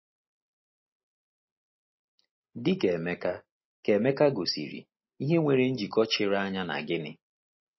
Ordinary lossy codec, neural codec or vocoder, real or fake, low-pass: MP3, 24 kbps; none; real; 7.2 kHz